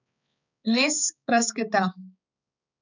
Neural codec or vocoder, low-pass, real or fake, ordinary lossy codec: codec, 16 kHz, 4 kbps, X-Codec, HuBERT features, trained on balanced general audio; 7.2 kHz; fake; none